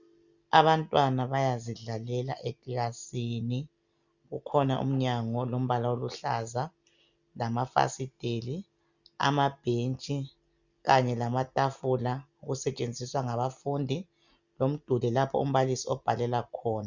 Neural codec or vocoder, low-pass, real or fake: none; 7.2 kHz; real